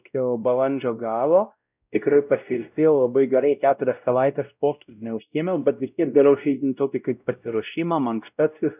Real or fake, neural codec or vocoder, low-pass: fake; codec, 16 kHz, 0.5 kbps, X-Codec, WavLM features, trained on Multilingual LibriSpeech; 3.6 kHz